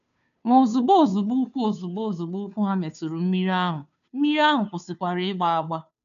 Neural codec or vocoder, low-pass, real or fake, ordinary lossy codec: codec, 16 kHz, 2 kbps, FunCodec, trained on Chinese and English, 25 frames a second; 7.2 kHz; fake; none